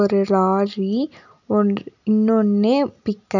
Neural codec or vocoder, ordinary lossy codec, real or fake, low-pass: none; none; real; 7.2 kHz